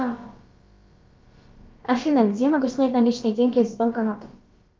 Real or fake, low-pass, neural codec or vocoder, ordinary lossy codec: fake; 7.2 kHz; codec, 16 kHz, about 1 kbps, DyCAST, with the encoder's durations; Opus, 32 kbps